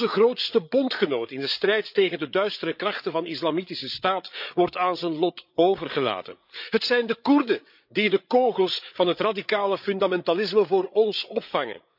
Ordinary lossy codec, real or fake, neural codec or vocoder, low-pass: none; fake; codec, 16 kHz, 8 kbps, FreqCodec, larger model; 5.4 kHz